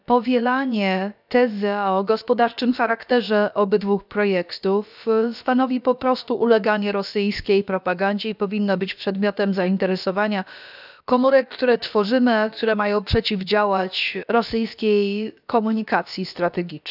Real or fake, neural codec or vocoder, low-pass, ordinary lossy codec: fake; codec, 16 kHz, about 1 kbps, DyCAST, with the encoder's durations; 5.4 kHz; none